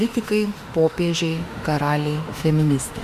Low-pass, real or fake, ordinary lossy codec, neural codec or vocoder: 14.4 kHz; fake; Opus, 64 kbps; autoencoder, 48 kHz, 32 numbers a frame, DAC-VAE, trained on Japanese speech